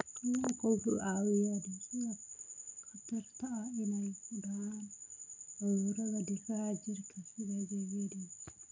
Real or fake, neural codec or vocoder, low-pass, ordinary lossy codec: real; none; 7.2 kHz; none